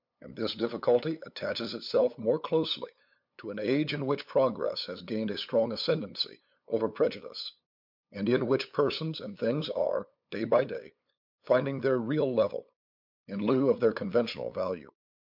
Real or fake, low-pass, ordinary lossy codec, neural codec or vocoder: fake; 5.4 kHz; MP3, 48 kbps; codec, 16 kHz, 8 kbps, FunCodec, trained on LibriTTS, 25 frames a second